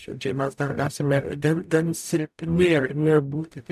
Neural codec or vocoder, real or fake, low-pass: codec, 44.1 kHz, 0.9 kbps, DAC; fake; 14.4 kHz